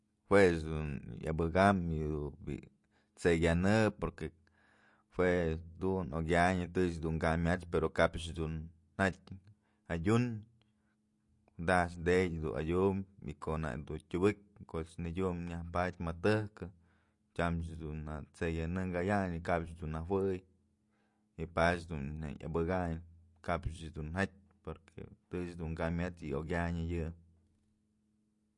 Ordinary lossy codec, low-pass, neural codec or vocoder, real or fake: MP3, 48 kbps; 10.8 kHz; none; real